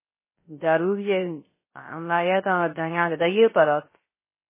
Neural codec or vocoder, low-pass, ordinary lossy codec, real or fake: codec, 16 kHz, 0.3 kbps, FocalCodec; 3.6 kHz; MP3, 16 kbps; fake